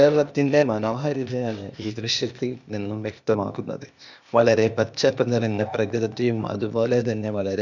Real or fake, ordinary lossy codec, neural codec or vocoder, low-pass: fake; none; codec, 16 kHz, 0.8 kbps, ZipCodec; 7.2 kHz